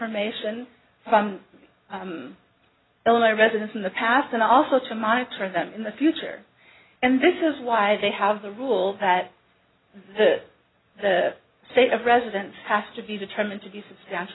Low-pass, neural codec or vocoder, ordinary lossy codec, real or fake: 7.2 kHz; none; AAC, 16 kbps; real